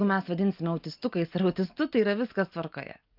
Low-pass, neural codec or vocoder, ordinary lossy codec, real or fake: 5.4 kHz; none; Opus, 24 kbps; real